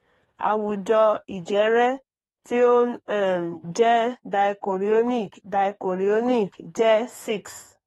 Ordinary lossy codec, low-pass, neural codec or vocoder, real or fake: AAC, 32 kbps; 14.4 kHz; codec, 32 kHz, 1.9 kbps, SNAC; fake